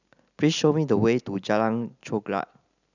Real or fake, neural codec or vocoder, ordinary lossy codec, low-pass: real; none; none; 7.2 kHz